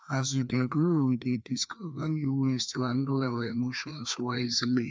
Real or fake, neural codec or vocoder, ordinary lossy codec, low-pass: fake; codec, 16 kHz, 2 kbps, FreqCodec, larger model; none; none